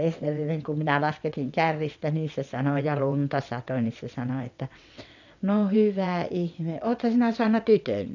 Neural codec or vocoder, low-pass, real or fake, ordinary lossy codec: vocoder, 22.05 kHz, 80 mel bands, WaveNeXt; 7.2 kHz; fake; none